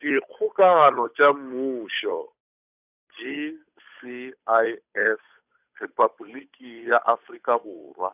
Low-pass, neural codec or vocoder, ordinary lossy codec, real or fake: 3.6 kHz; codec, 16 kHz, 8 kbps, FunCodec, trained on Chinese and English, 25 frames a second; none; fake